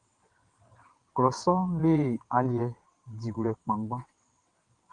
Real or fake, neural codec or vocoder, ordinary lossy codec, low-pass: fake; vocoder, 22.05 kHz, 80 mel bands, WaveNeXt; Opus, 24 kbps; 9.9 kHz